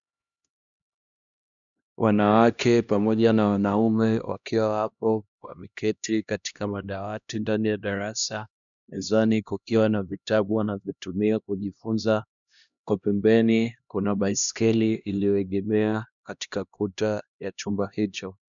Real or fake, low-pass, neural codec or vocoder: fake; 7.2 kHz; codec, 16 kHz, 1 kbps, X-Codec, HuBERT features, trained on LibriSpeech